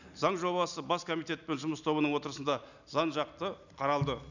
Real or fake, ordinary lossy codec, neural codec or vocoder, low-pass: real; none; none; 7.2 kHz